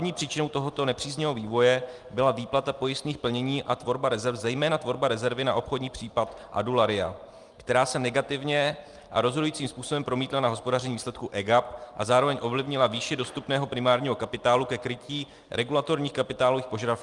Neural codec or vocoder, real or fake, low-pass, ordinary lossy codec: none; real; 10.8 kHz; Opus, 32 kbps